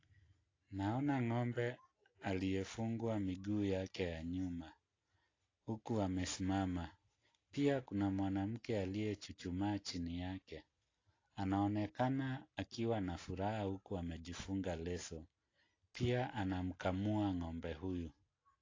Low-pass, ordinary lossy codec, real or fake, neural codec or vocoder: 7.2 kHz; AAC, 32 kbps; real; none